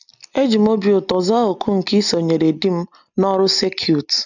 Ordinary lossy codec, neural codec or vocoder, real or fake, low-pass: none; none; real; 7.2 kHz